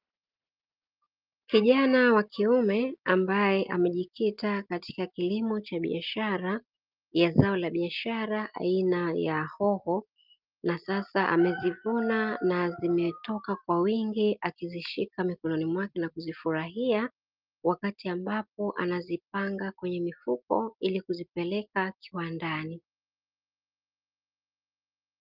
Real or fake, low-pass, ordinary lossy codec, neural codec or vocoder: real; 5.4 kHz; Opus, 24 kbps; none